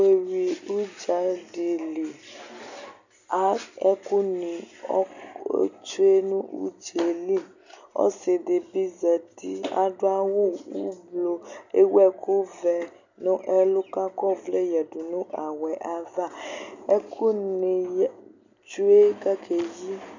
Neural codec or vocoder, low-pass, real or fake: none; 7.2 kHz; real